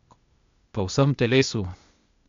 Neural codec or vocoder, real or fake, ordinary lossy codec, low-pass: codec, 16 kHz, 0.8 kbps, ZipCodec; fake; MP3, 64 kbps; 7.2 kHz